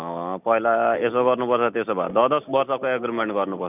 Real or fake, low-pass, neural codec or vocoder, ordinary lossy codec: real; 3.6 kHz; none; none